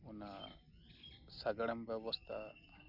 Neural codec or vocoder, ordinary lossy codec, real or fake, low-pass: none; none; real; 5.4 kHz